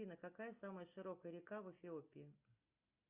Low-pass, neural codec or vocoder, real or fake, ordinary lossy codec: 3.6 kHz; none; real; Opus, 64 kbps